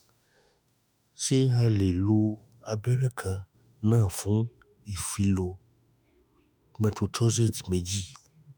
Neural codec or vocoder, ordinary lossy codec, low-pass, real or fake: autoencoder, 48 kHz, 32 numbers a frame, DAC-VAE, trained on Japanese speech; none; none; fake